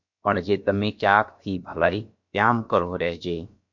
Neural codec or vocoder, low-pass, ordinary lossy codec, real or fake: codec, 16 kHz, about 1 kbps, DyCAST, with the encoder's durations; 7.2 kHz; MP3, 48 kbps; fake